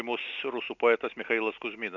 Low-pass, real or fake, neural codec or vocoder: 7.2 kHz; real; none